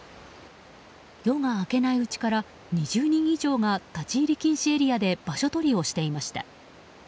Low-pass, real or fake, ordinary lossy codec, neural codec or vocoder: none; real; none; none